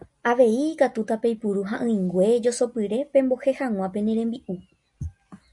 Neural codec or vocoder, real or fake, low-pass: none; real; 10.8 kHz